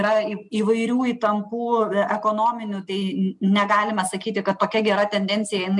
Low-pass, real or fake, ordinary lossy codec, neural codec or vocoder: 10.8 kHz; real; MP3, 96 kbps; none